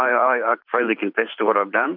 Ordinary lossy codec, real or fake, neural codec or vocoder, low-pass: MP3, 48 kbps; fake; vocoder, 44.1 kHz, 128 mel bands every 512 samples, BigVGAN v2; 5.4 kHz